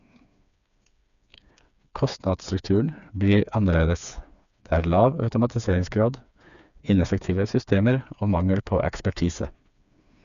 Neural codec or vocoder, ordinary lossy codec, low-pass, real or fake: codec, 16 kHz, 4 kbps, FreqCodec, smaller model; none; 7.2 kHz; fake